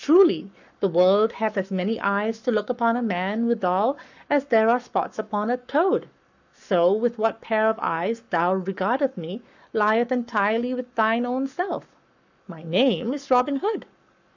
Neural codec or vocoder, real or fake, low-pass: codec, 44.1 kHz, 7.8 kbps, Pupu-Codec; fake; 7.2 kHz